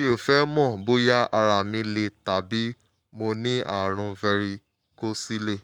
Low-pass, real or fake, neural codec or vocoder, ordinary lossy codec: 19.8 kHz; fake; codec, 44.1 kHz, 7.8 kbps, Pupu-Codec; none